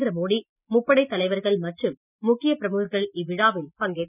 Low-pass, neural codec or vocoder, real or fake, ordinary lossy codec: 3.6 kHz; none; real; none